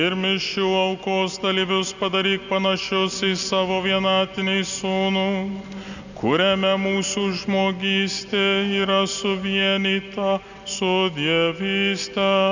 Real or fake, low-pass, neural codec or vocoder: real; 7.2 kHz; none